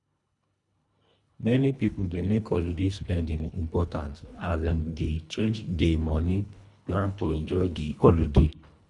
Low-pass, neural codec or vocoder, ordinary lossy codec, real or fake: 10.8 kHz; codec, 24 kHz, 1.5 kbps, HILCodec; none; fake